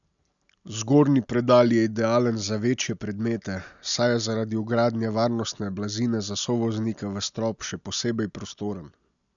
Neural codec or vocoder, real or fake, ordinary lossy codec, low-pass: none; real; none; 7.2 kHz